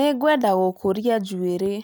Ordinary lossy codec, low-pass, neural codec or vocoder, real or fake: none; none; none; real